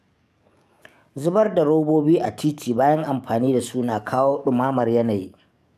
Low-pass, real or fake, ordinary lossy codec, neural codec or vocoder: 14.4 kHz; fake; none; codec, 44.1 kHz, 7.8 kbps, DAC